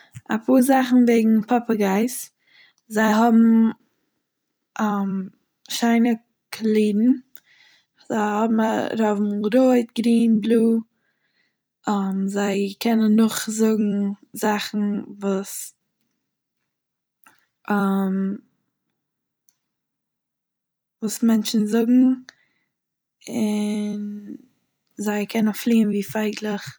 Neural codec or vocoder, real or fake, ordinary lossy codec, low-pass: vocoder, 44.1 kHz, 128 mel bands every 256 samples, BigVGAN v2; fake; none; none